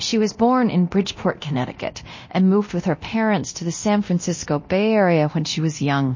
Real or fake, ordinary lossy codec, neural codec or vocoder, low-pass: fake; MP3, 32 kbps; codec, 24 kHz, 0.9 kbps, DualCodec; 7.2 kHz